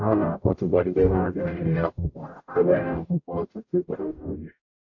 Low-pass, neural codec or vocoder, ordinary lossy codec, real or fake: 7.2 kHz; codec, 44.1 kHz, 0.9 kbps, DAC; AAC, 48 kbps; fake